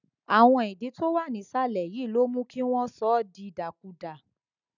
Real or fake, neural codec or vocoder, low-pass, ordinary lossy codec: real; none; 7.2 kHz; none